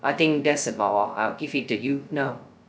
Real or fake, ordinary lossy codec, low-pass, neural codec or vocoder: fake; none; none; codec, 16 kHz, 0.2 kbps, FocalCodec